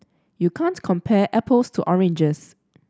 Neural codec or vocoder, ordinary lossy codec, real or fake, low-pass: none; none; real; none